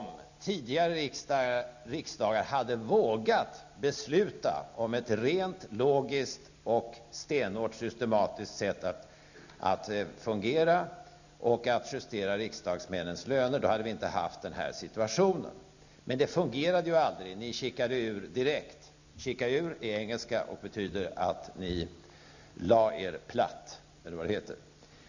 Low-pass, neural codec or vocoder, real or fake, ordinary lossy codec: 7.2 kHz; none; real; none